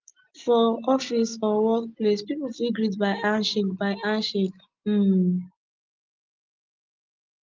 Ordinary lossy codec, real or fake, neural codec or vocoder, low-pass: Opus, 32 kbps; real; none; 7.2 kHz